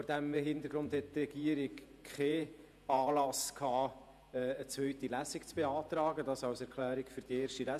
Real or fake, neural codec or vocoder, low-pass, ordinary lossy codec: fake; vocoder, 48 kHz, 128 mel bands, Vocos; 14.4 kHz; none